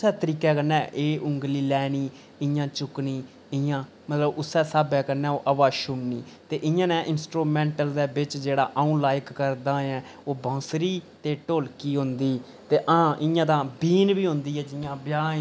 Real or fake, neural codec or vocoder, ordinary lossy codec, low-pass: real; none; none; none